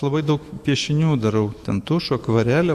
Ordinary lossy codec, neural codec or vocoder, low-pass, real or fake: AAC, 96 kbps; autoencoder, 48 kHz, 128 numbers a frame, DAC-VAE, trained on Japanese speech; 14.4 kHz; fake